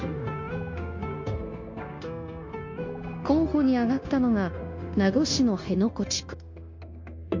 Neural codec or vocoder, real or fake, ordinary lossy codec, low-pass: codec, 16 kHz, 0.9 kbps, LongCat-Audio-Codec; fake; MP3, 48 kbps; 7.2 kHz